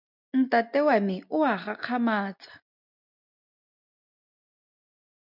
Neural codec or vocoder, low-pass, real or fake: none; 5.4 kHz; real